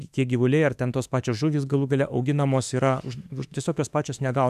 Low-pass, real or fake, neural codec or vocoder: 14.4 kHz; fake; autoencoder, 48 kHz, 32 numbers a frame, DAC-VAE, trained on Japanese speech